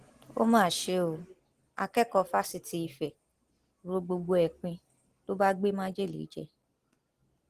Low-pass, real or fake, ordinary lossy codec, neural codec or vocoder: 14.4 kHz; real; Opus, 16 kbps; none